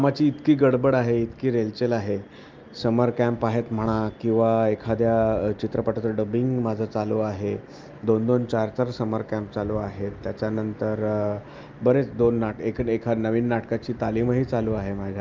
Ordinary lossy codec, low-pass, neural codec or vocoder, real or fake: Opus, 24 kbps; 7.2 kHz; none; real